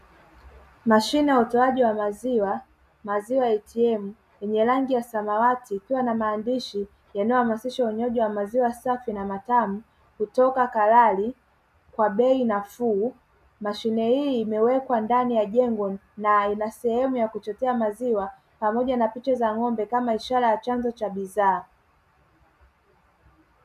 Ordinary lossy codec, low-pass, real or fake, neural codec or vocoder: MP3, 96 kbps; 14.4 kHz; real; none